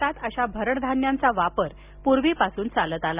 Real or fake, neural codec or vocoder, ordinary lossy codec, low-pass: real; none; Opus, 64 kbps; 3.6 kHz